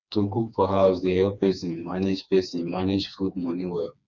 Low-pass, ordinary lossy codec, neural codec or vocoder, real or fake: 7.2 kHz; none; codec, 16 kHz, 2 kbps, FreqCodec, smaller model; fake